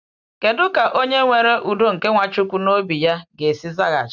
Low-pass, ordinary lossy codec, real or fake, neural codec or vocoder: 7.2 kHz; none; real; none